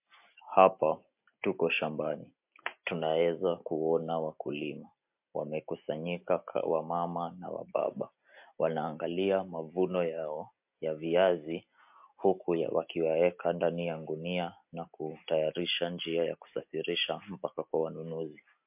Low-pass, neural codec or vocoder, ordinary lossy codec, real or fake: 3.6 kHz; none; MP3, 32 kbps; real